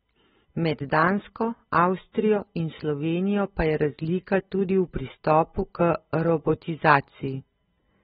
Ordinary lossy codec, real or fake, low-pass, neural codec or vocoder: AAC, 16 kbps; real; 10.8 kHz; none